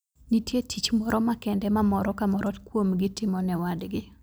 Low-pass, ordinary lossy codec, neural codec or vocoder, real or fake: none; none; none; real